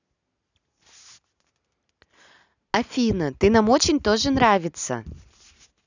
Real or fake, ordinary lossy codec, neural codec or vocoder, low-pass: real; none; none; 7.2 kHz